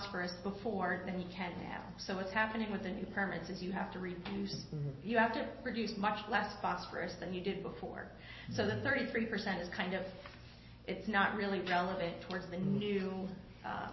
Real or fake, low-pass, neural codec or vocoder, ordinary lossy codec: real; 7.2 kHz; none; MP3, 24 kbps